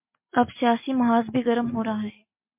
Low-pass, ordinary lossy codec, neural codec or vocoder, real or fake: 3.6 kHz; MP3, 24 kbps; none; real